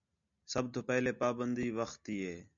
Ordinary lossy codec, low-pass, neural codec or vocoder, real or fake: MP3, 96 kbps; 7.2 kHz; none; real